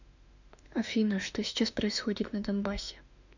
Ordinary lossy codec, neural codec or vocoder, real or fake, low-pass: none; autoencoder, 48 kHz, 32 numbers a frame, DAC-VAE, trained on Japanese speech; fake; 7.2 kHz